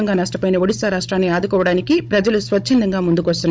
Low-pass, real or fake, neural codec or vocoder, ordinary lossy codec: none; fake; codec, 16 kHz, 16 kbps, FunCodec, trained on Chinese and English, 50 frames a second; none